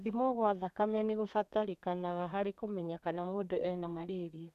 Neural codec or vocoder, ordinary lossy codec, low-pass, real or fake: codec, 32 kHz, 1.9 kbps, SNAC; none; 14.4 kHz; fake